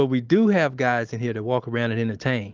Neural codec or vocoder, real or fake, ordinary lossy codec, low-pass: none; real; Opus, 32 kbps; 7.2 kHz